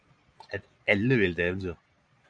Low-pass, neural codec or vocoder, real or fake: 9.9 kHz; vocoder, 22.05 kHz, 80 mel bands, Vocos; fake